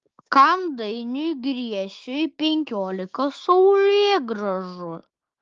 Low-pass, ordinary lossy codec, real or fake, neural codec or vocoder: 7.2 kHz; Opus, 32 kbps; fake; codec, 16 kHz, 6 kbps, DAC